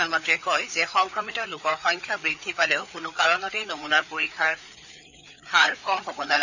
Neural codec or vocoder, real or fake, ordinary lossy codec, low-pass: codec, 16 kHz, 4 kbps, FreqCodec, larger model; fake; none; 7.2 kHz